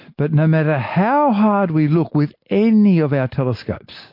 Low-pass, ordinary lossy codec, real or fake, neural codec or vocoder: 5.4 kHz; MP3, 32 kbps; real; none